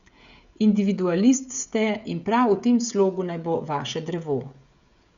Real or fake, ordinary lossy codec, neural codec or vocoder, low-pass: fake; Opus, 64 kbps; codec, 16 kHz, 16 kbps, FreqCodec, smaller model; 7.2 kHz